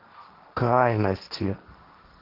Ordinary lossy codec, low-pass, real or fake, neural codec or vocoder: Opus, 16 kbps; 5.4 kHz; fake; codec, 16 kHz, 1.1 kbps, Voila-Tokenizer